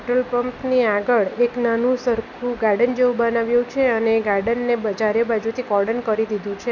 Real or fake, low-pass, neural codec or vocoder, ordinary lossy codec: real; 7.2 kHz; none; none